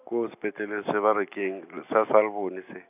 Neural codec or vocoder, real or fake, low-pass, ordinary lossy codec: none; real; 3.6 kHz; none